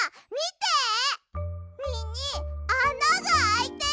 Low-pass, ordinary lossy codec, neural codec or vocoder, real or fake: none; none; none; real